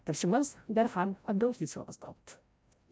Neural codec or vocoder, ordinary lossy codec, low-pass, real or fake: codec, 16 kHz, 0.5 kbps, FreqCodec, larger model; none; none; fake